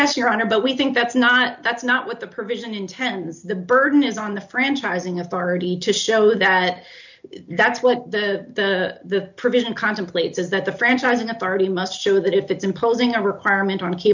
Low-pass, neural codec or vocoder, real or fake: 7.2 kHz; none; real